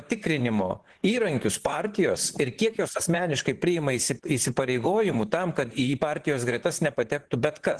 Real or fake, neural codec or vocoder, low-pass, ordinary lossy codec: fake; vocoder, 44.1 kHz, 128 mel bands, Pupu-Vocoder; 10.8 kHz; Opus, 16 kbps